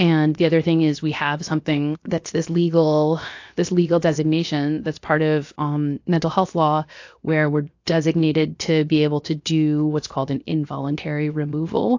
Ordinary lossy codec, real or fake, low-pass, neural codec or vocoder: AAC, 48 kbps; fake; 7.2 kHz; codec, 16 kHz, 0.7 kbps, FocalCodec